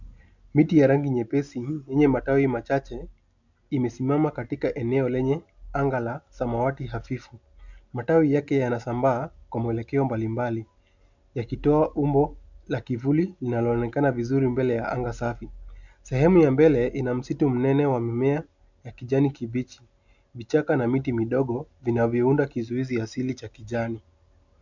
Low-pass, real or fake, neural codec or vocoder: 7.2 kHz; real; none